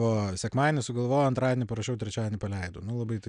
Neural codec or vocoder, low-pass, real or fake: none; 9.9 kHz; real